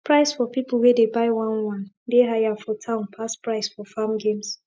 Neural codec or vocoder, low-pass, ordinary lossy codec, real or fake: none; none; none; real